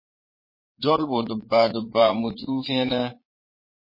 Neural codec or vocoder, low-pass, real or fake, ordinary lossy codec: vocoder, 22.05 kHz, 80 mel bands, Vocos; 5.4 kHz; fake; MP3, 24 kbps